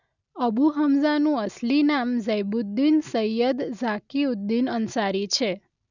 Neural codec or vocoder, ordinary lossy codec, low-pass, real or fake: none; none; 7.2 kHz; real